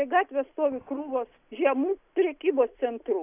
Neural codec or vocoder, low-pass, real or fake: none; 3.6 kHz; real